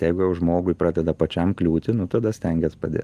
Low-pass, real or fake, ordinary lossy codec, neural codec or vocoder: 14.4 kHz; real; Opus, 32 kbps; none